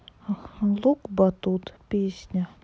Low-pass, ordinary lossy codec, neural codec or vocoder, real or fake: none; none; none; real